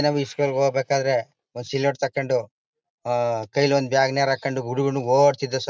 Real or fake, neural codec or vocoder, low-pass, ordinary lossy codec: real; none; none; none